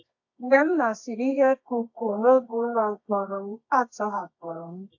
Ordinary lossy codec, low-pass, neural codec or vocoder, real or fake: AAC, 48 kbps; 7.2 kHz; codec, 24 kHz, 0.9 kbps, WavTokenizer, medium music audio release; fake